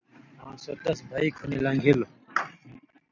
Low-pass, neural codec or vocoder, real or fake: 7.2 kHz; none; real